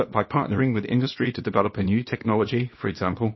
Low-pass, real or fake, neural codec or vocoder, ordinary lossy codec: 7.2 kHz; fake; codec, 24 kHz, 0.9 kbps, WavTokenizer, small release; MP3, 24 kbps